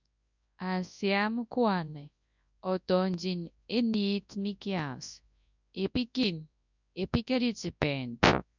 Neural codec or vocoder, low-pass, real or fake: codec, 24 kHz, 0.9 kbps, WavTokenizer, large speech release; 7.2 kHz; fake